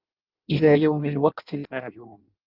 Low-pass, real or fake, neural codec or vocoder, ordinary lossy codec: 5.4 kHz; fake; codec, 16 kHz in and 24 kHz out, 0.6 kbps, FireRedTTS-2 codec; Opus, 32 kbps